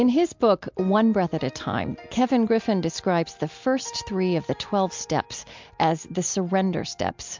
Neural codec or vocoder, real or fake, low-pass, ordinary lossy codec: none; real; 7.2 kHz; MP3, 64 kbps